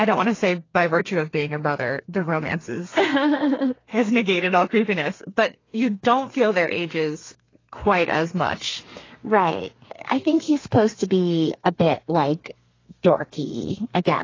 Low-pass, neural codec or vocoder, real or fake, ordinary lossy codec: 7.2 kHz; codec, 32 kHz, 1.9 kbps, SNAC; fake; AAC, 32 kbps